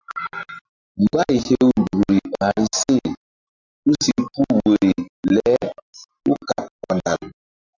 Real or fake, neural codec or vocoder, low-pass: real; none; 7.2 kHz